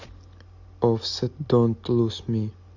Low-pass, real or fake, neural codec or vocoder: 7.2 kHz; real; none